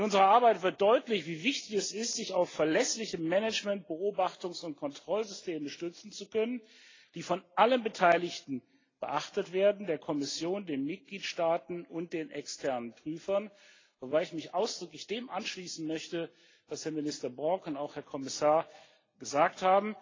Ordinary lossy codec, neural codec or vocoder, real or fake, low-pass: AAC, 32 kbps; none; real; 7.2 kHz